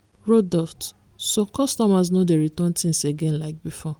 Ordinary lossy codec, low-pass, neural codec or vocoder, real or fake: Opus, 32 kbps; 19.8 kHz; none; real